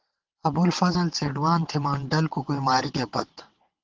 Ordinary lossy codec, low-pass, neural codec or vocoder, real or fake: Opus, 16 kbps; 7.2 kHz; vocoder, 22.05 kHz, 80 mel bands, WaveNeXt; fake